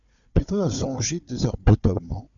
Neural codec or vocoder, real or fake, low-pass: codec, 16 kHz, 4 kbps, FunCodec, trained on Chinese and English, 50 frames a second; fake; 7.2 kHz